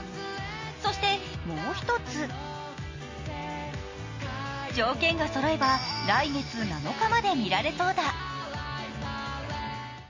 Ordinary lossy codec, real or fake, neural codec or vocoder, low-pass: MP3, 32 kbps; real; none; 7.2 kHz